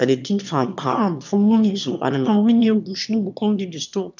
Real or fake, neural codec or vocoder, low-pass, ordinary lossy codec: fake; autoencoder, 22.05 kHz, a latent of 192 numbers a frame, VITS, trained on one speaker; 7.2 kHz; none